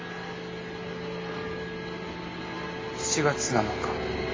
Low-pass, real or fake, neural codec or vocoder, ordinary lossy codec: 7.2 kHz; real; none; AAC, 32 kbps